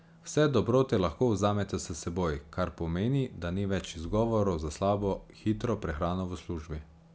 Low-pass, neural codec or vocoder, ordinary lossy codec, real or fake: none; none; none; real